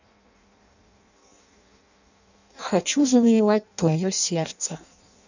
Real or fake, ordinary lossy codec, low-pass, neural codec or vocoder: fake; none; 7.2 kHz; codec, 16 kHz in and 24 kHz out, 0.6 kbps, FireRedTTS-2 codec